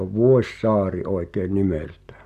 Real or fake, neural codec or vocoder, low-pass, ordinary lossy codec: real; none; 14.4 kHz; none